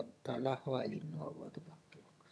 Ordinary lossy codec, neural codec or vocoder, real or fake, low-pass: none; vocoder, 22.05 kHz, 80 mel bands, HiFi-GAN; fake; none